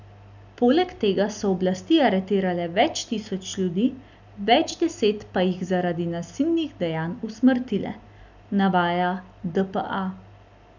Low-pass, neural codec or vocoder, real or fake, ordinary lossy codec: 7.2 kHz; none; real; none